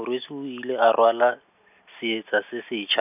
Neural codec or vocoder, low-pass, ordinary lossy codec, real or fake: none; 3.6 kHz; none; real